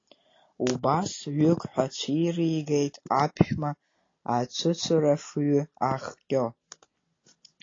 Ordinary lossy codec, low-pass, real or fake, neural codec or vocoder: AAC, 32 kbps; 7.2 kHz; real; none